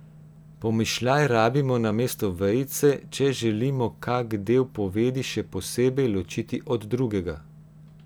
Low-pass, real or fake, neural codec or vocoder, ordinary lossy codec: none; real; none; none